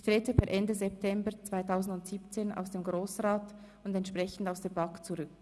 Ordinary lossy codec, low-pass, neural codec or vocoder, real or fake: none; none; none; real